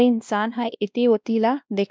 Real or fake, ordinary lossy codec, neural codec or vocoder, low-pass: fake; none; codec, 16 kHz, 1 kbps, X-Codec, WavLM features, trained on Multilingual LibriSpeech; none